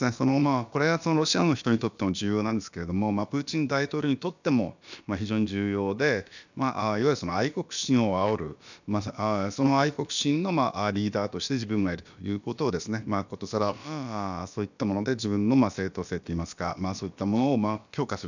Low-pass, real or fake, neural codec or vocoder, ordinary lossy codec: 7.2 kHz; fake; codec, 16 kHz, about 1 kbps, DyCAST, with the encoder's durations; none